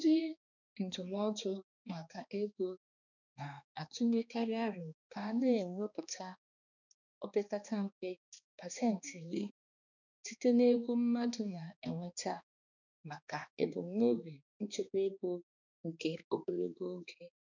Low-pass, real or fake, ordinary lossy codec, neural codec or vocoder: 7.2 kHz; fake; none; codec, 16 kHz, 2 kbps, X-Codec, HuBERT features, trained on balanced general audio